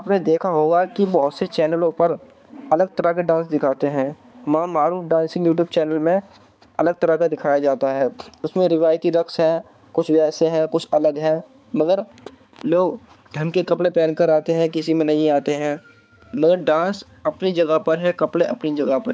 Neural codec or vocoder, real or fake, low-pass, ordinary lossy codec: codec, 16 kHz, 4 kbps, X-Codec, HuBERT features, trained on balanced general audio; fake; none; none